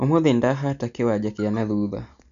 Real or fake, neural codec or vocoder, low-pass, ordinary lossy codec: real; none; 7.2 kHz; MP3, 96 kbps